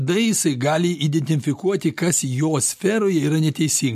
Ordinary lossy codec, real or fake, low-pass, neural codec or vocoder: MP3, 64 kbps; real; 14.4 kHz; none